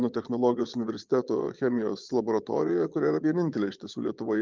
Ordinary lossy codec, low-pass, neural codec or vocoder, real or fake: Opus, 24 kbps; 7.2 kHz; vocoder, 22.05 kHz, 80 mel bands, Vocos; fake